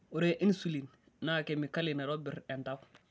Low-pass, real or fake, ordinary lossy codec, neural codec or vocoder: none; real; none; none